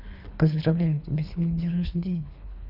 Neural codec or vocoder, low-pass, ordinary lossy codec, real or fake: codec, 24 kHz, 3 kbps, HILCodec; 5.4 kHz; none; fake